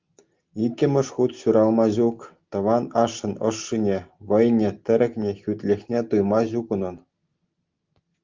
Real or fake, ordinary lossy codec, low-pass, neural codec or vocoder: real; Opus, 32 kbps; 7.2 kHz; none